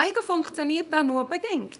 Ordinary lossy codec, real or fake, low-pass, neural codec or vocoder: none; fake; 10.8 kHz; codec, 24 kHz, 0.9 kbps, WavTokenizer, small release